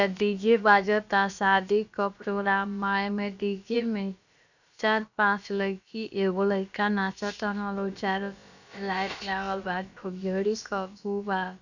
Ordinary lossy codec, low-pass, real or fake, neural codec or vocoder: none; 7.2 kHz; fake; codec, 16 kHz, about 1 kbps, DyCAST, with the encoder's durations